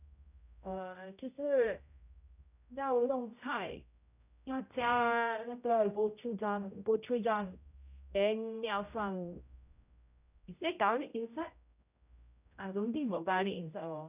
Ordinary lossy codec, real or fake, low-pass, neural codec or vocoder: none; fake; 3.6 kHz; codec, 16 kHz, 0.5 kbps, X-Codec, HuBERT features, trained on general audio